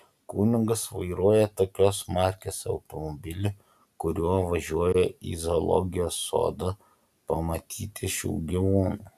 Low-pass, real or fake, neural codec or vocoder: 14.4 kHz; real; none